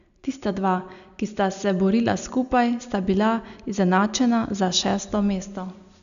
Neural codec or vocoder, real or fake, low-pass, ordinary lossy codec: none; real; 7.2 kHz; none